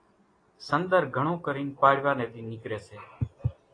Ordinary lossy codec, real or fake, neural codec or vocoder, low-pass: AAC, 32 kbps; real; none; 9.9 kHz